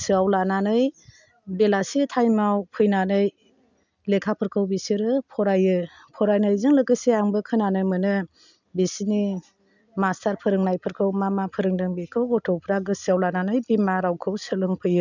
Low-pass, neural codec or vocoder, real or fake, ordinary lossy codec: 7.2 kHz; none; real; none